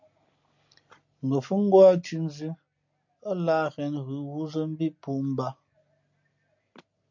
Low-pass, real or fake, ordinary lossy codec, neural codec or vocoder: 7.2 kHz; real; MP3, 48 kbps; none